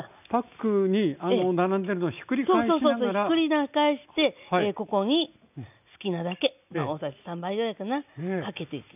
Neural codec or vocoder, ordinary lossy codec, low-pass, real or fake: none; none; 3.6 kHz; real